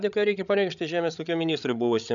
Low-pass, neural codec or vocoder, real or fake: 7.2 kHz; codec, 16 kHz, 16 kbps, FreqCodec, larger model; fake